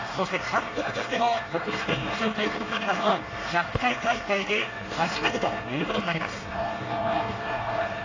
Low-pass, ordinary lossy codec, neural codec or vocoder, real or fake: 7.2 kHz; AAC, 32 kbps; codec, 24 kHz, 1 kbps, SNAC; fake